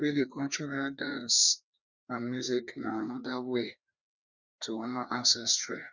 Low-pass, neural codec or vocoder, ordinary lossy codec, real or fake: 7.2 kHz; codec, 16 kHz, 2 kbps, FreqCodec, larger model; Opus, 64 kbps; fake